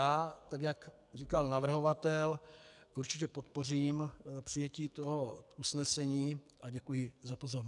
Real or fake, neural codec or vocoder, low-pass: fake; codec, 44.1 kHz, 2.6 kbps, SNAC; 10.8 kHz